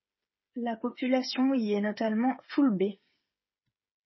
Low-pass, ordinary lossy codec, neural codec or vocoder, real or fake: 7.2 kHz; MP3, 24 kbps; codec, 16 kHz, 8 kbps, FreqCodec, smaller model; fake